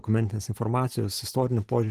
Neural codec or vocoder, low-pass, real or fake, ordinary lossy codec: none; 14.4 kHz; real; Opus, 16 kbps